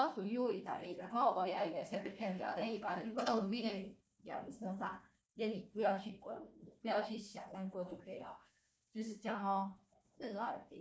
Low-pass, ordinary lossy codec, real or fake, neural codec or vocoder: none; none; fake; codec, 16 kHz, 1 kbps, FunCodec, trained on Chinese and English, 50 frames a second